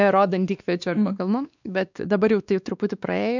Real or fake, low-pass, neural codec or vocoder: fake; 7.2 kHz; codec, 16 kHz, 2 kbps, X-Codec, WavLM features, trained on Multilingual LibriSpeech